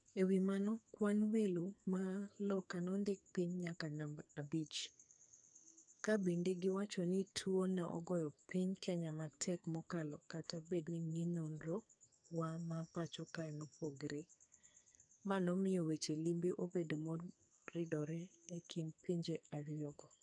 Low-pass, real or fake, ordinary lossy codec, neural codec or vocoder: 9.9 kHz; fake; none; codec, 44.1 kHz, 2.6 kbps, SNAC